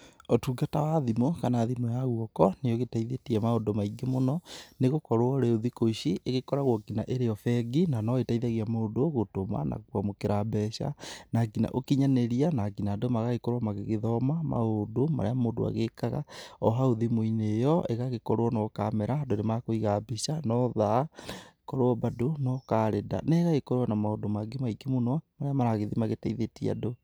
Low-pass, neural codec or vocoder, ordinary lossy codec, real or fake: none; none; none; real